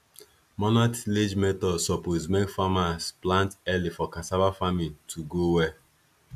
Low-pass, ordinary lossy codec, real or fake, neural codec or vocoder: 14.4 kHz; none; real; none